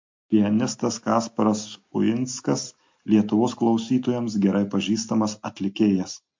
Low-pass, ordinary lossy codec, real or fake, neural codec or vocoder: 7.2 kHz; MP3, 48 kbps; real; none